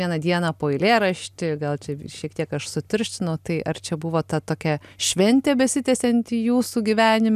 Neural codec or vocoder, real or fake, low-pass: none; real; 14.4 kHz